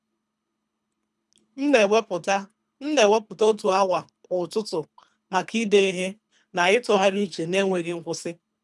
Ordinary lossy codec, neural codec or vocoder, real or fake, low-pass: none; codec, 24 kHz, 3 kbps, HILCodec; fake; none